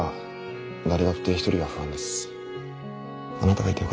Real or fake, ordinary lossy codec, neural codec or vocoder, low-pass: real; none; none; none